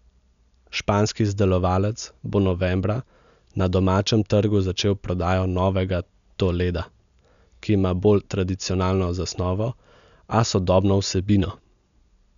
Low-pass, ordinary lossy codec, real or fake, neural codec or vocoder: 7.2 kHz; none; real; none